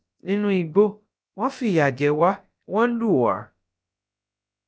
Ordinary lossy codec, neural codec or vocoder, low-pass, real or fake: none; codec, 16 kHz, about 1 kbps, DyCAST, with the encoder's durations; none; fake